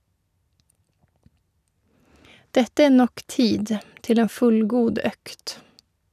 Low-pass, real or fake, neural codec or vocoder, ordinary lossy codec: 14.4 kHz; real; none; none